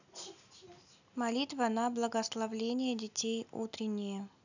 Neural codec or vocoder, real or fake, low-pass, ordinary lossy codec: none; real; 7.2 kHz; none